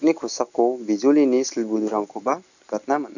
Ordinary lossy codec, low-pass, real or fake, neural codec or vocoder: none; 7.2 kHz; real; none